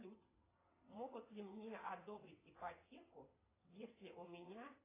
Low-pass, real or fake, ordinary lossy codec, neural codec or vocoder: 3.6 kHz; fake; AAC, 16 kbps; vocoder, 22.05 kHz, 80 mel bands, WaveNeXt